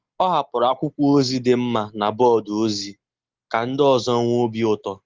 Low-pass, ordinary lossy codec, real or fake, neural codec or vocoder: 7.2 kHz; Opus, 16 kbps; real; none